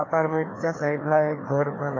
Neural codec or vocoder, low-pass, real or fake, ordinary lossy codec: codec, 16 kHz, 2 kbps, FreqCodec, larger model; 7.2 kHz; fake; AAC, 32 kbps